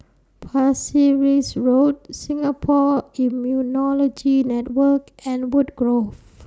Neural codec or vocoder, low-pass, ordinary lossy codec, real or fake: none; none; none; real